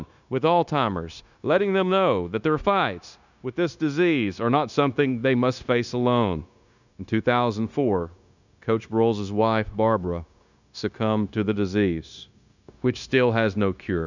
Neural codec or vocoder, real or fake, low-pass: codec, 16 kHz, 0.9 kbps, LongCat-Audio-Codec; fake; 7.2 kHz